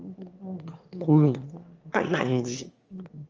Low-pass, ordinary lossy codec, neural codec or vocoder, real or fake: 7.2 kHz; Opus, 24 kbps; autoencoder, 22.05 kHz, a latent of 192 numbers a frame, VITS, trained on one speaker; fake